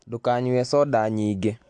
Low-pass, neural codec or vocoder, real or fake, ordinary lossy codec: 9.9 kHz; none; real; AAC, 64 kbps